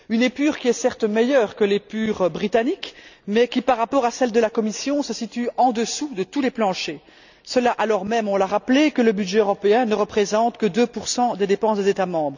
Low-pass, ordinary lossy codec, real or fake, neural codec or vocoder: 7.2 kHz; none; real; none